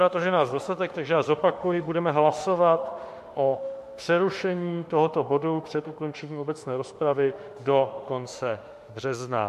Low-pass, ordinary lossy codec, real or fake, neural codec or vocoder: 14.4 kHz; MP3, 64 kbps; fake; autoencoder, 48 kHz, 32 numbers a frame, DAC-VAE, trained on Japanese speech